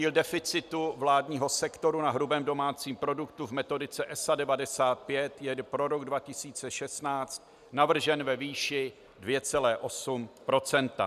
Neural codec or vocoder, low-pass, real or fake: none; 14.4 kHz; real